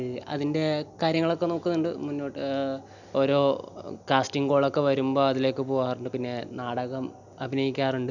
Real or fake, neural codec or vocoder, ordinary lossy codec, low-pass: real; none; none; 7.2 kHz